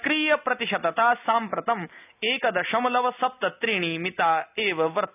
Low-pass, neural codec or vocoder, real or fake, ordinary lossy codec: 3.6 kHz; none; real; none